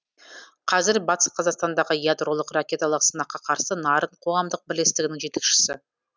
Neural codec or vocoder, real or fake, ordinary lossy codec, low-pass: none; real; none; none